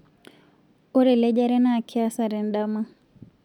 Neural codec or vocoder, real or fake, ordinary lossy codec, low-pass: none; real; none; 19.8 kHz